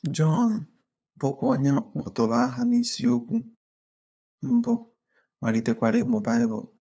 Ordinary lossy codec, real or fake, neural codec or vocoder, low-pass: none; fake; codec, 16 kHz, 2 kbps, FunCodec, trained on LibriTTS, 25 frames a second; none